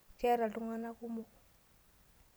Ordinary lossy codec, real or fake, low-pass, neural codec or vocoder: none; fake; none; vocoder, 44.1 kHz, 128 mel bands every 256 samples, BigVGAN v2